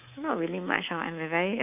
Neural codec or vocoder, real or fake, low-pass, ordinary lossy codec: codec, 24 kHz, 3.1 kbps, DualCodec; fake; 3.6 kHz; none